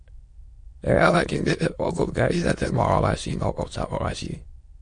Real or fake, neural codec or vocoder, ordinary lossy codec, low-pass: fake; autoencoder, 22.05 kHz, a latent of 192 numbers a frame, VITS, trained on many speakers; MP3, 48 kbps; 9.9 kHz